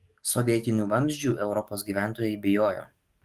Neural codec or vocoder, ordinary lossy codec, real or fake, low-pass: codec, 44.1 kHz, 7.8 kbps, DAC; Opus, 24 kbps; fake; 14.4 kHz